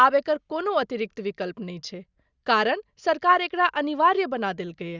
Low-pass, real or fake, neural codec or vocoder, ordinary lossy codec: 7.2 kHz; real; none; Opus, 64 kbps